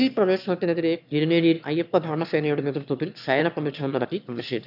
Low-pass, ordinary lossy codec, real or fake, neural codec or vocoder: 5.4 kHz; none; fake; autoencoder, 22.05 kHz, a latent of 192 numbers a frame, VITS, trained on one speaker